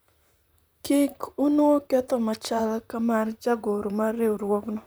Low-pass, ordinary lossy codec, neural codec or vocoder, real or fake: none; none; vocoder, 44.1 kHz, 128 mel bands, Pupu-Vocoder; fake